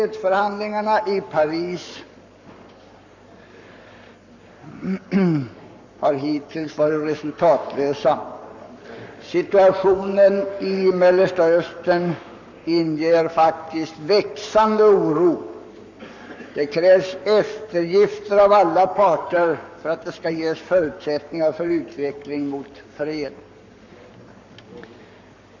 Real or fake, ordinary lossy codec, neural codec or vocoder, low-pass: fake; none; codec, 44.1 kHz, 7.8 kbps, Pupu-Codec; 7.2 kHz